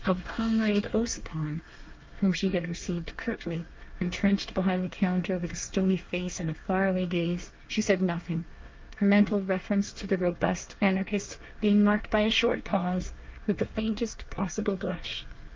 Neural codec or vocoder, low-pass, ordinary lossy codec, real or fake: codec, 24 kHz, 1 kbps, SNAC; 7.2 kHz; Opus, 24 kbps; fake